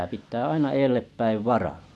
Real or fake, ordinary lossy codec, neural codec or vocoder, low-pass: real; none; none; none